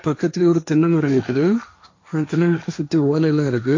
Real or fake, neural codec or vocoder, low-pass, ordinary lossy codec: fake; codec, 16 kHz, 1.1 kbps, Voila-Tokenizer; 7.2 kHz; AAC, 48 kbps